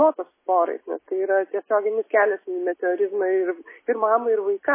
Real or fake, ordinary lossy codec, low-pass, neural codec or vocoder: real; MP3, 16 kbps; 3.6 kHz; none